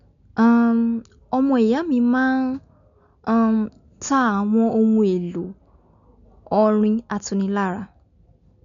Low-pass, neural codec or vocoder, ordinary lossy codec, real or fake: 7.2 kHz; none; none; real